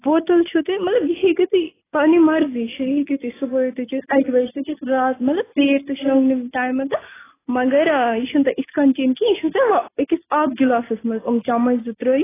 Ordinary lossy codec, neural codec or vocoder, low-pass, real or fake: AAC, 16 kbps; none; 3.6 kHz; real